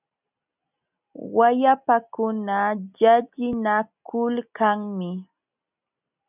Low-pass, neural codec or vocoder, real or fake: 3.6 kHz; none; real